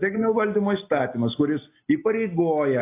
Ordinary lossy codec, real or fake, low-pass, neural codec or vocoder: AAC, 24 kbps; real; 3.6 kHz; none